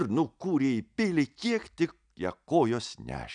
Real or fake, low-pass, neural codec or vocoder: real; 9.9 kHz; none